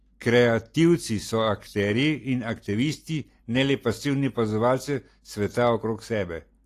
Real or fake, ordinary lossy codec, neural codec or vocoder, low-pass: real; AAC, 48 kbps; none; 14.4 kHz